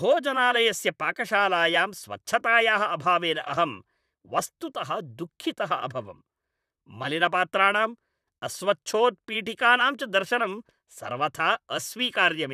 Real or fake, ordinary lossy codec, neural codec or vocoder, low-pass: fake; none; vocoder, 44.1 kHz, 128 mel bands, Pupu-Vocoder; 14.4 kHz